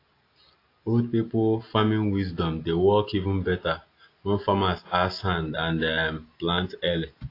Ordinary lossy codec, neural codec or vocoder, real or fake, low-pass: AAC, 32 kbps; none; real; 5.4 kHz